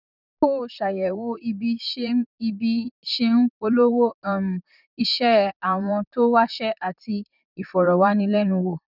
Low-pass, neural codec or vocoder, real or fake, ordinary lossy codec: 5.4 kHz; vocoder, 44.1 kHz, 128 mel bands, Pupu-Vocoder; fake; none